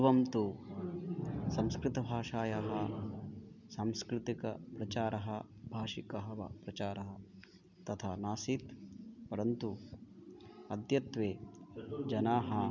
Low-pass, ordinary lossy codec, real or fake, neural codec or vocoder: 7.2 kHz; none; real; none